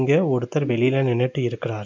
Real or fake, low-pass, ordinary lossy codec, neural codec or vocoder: real; 7.2 kHz; MP3, 64 kbps; none